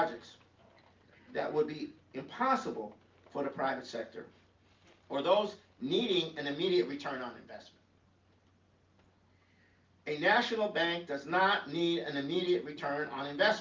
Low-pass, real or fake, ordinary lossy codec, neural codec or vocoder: 7.2 kHz; real; Opus, 32 kbps; none